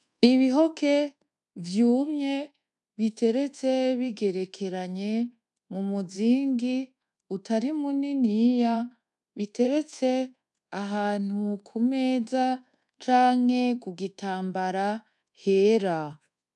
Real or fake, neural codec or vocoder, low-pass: fake; codec, 24 kHz, 1.2 kbps, DualCodec; 10.8 kHz